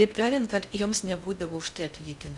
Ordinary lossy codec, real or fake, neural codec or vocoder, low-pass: Opus, 64 kbps; fake; codec, 16 kHz in and 24 kHz out, 0.6 kbps, FocalCodec, streaming, 2048 codes; 10.8 kHz